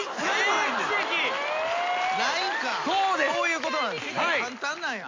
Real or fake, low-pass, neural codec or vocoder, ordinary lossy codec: real; 7.2 kHz; none; MP3, 48 kbps